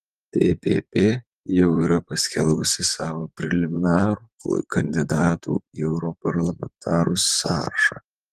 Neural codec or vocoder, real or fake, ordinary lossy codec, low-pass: vocoder, 44.1 kHz, 128 mel bands, Pupu-Vocoder; fake; Opus, 24 kbps; 14.4 kHz